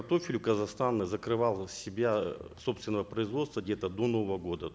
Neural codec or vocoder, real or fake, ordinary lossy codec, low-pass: none; real; none; none